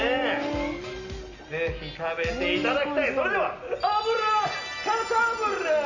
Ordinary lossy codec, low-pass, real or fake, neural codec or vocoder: none; 7.2 kHz; real; none